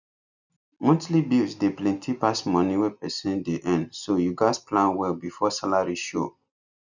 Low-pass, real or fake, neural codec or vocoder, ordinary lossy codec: 7.2 kHz; real; none; none